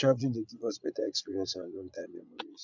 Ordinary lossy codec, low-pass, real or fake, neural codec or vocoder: none; 7.2 kHz; fake; vocoder, 22.05 kHz, 80 mel bands, Vocos